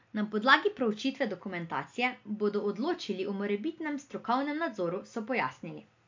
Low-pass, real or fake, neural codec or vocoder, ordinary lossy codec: 7.2 kHz; real; none; MP3, 48 kbps